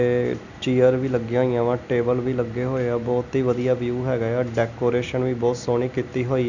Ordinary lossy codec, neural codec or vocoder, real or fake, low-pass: none; none; real; 7.2 kHz